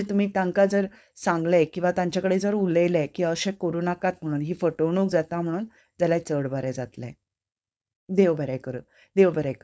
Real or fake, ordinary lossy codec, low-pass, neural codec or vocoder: fake; none; none; codec, 16 kHz, 4.8 kbps, FACodec